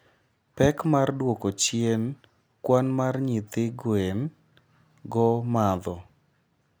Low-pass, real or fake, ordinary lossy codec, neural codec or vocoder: none; real; none; none